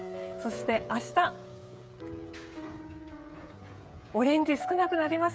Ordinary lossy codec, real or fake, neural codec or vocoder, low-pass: none; fake; codec, 16 kHz, 16 kbps, FreqCodec, smaller model; none